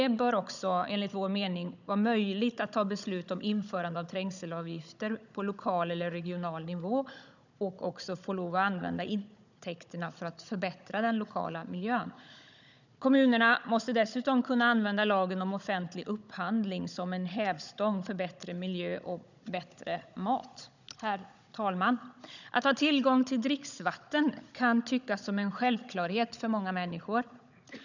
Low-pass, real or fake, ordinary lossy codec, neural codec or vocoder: 7.2 kHz; fake; none; codec, 16 kHz, 16 kbps, FunCodec, trained on Chinese and English, 50 frames a second